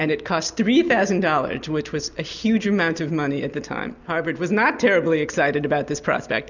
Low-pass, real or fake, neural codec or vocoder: 7.2 kHz; real; none